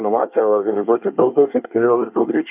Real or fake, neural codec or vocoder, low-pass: fake; codec, 24 kHz, 1 kbps, SNAC; 3.6 kHz